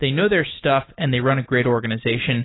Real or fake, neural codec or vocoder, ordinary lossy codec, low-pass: real; none; AAC, 16 kbps; 7.2 kHz